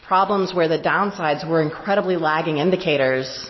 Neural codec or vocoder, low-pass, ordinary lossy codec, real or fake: none; 7.2 kHz; MP3, 24 kbps; real